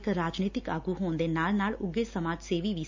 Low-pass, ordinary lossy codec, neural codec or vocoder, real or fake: 7.2 kHz; MP3, 64 kbps; none; real